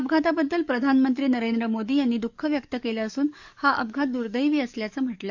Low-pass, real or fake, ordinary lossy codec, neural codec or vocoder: 7.2 kHz; fake; none; codec, 44.1 kHz, 7.8 kbps, DAC